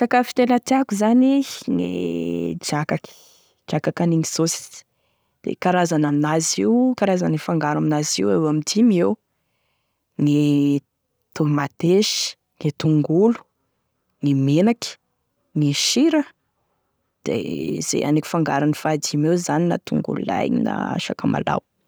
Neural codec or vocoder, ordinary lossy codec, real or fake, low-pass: none; none; real; none